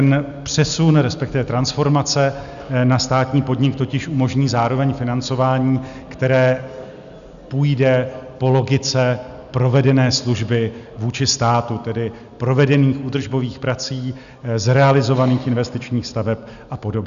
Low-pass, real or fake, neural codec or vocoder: 7.2 kHz; real; none